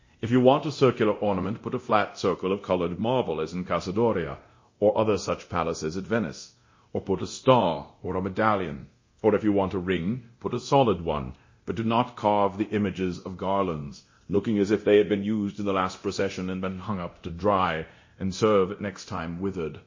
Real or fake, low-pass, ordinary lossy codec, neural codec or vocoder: fake; 7.2 kHz; MP3, 32 kbps; codec, 24 kHz, 0.9 kbps, DualCodec